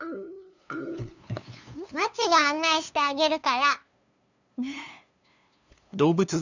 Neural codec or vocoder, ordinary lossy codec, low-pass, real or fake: codec, 16 kHz, 2 kbps, FunCodec, trained on LibriTTS, 25 frames a second; none; 7.2 kHz; fake